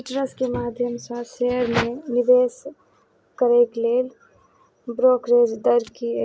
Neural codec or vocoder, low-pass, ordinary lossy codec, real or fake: none; none; none; real